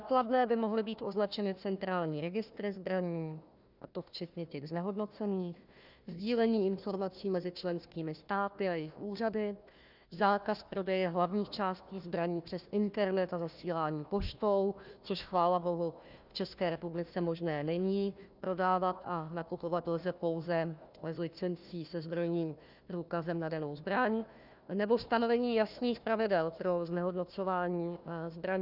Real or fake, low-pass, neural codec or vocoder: fake; 5.4 kHz; codec, 16 kHz, 1 kbps, FunCodec, trained on Chinese and English, 50 frames a second